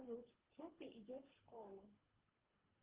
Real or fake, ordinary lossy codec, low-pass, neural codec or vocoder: fake; Opus, 16 kbps; 3.6 kHz; codec, 24 kHz, 3 kbps, HILCodec